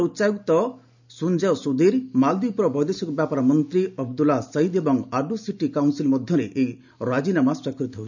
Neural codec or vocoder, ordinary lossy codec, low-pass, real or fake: none; none; 7.2 kHz; real